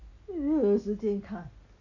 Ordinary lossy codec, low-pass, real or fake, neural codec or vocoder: AAC, 48 kbps; 7.2 kHz; real; none